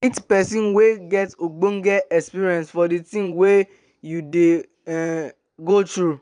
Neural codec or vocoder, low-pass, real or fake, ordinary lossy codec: vocoder, 22.05 kHz, 80 mel bands, Vocos; 9.9 kHz; fake; none